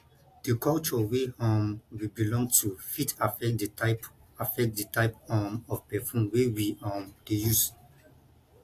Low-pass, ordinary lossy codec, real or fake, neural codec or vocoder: 14.4 kHz; AAC, 64 kbps; real; none